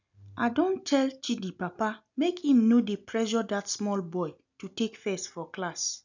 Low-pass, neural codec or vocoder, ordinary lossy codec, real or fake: 7.2 kHz; none; none; real